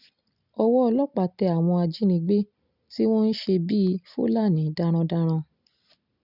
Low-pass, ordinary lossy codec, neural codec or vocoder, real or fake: 5.4 kHz; none; none; real